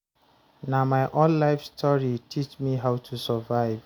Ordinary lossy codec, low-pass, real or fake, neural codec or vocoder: none; none; real; none